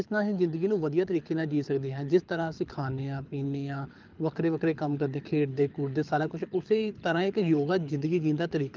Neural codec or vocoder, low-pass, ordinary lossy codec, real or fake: codec, 24 kHz, 6 kbps, HILCodec; 7.2 kHz; Opus, 24 kbps; fake